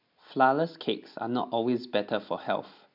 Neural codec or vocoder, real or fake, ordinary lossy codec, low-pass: none; real; MP3, 48 kbps; 5.4 kHz